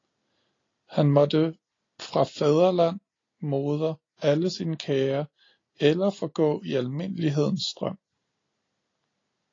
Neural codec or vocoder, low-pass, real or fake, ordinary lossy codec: none; 7.2 kHz; real; AAC, 32 kbps